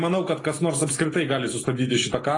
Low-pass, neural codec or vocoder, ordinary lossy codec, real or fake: 10.8 kHz; none; AAC, 32 kbps; real